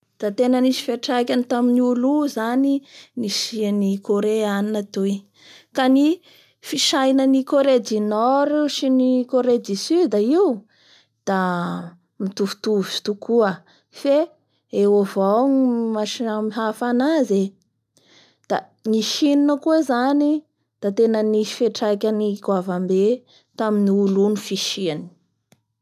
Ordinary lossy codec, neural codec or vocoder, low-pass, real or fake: none; none; 14.4 kHz; real